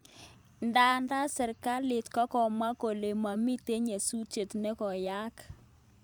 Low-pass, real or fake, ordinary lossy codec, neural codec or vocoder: none; fake; none; vocoder, 44.1 kHz, 128 mel bands every 512 samples, BigVGAN v2